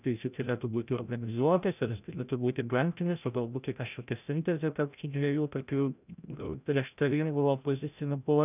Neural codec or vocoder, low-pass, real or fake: codec, 16 kHz, 0.5 kbps, FreqCodec, larger model; 3.6 kHz; fake